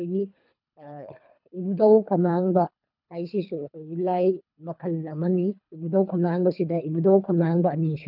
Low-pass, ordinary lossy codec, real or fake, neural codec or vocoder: 5.4 kHz; none; fake; codec, 24 kHz, 3 kbps, HILCodec